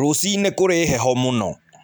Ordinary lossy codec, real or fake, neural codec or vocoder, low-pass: none; real; none; none